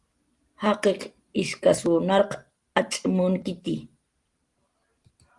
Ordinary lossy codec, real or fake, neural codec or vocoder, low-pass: Opus, 24 kbps; real; none; 10.8 kHz